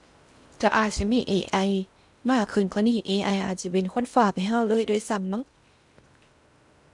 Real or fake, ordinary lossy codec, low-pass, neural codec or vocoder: fake; none; 10.8 kHz; codec, 16 kHz in and 24 kHz out, 0.6 kbps, FocalCodec, streaming, 4096 codes